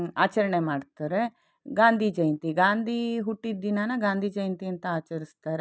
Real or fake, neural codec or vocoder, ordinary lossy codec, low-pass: real; none; none; none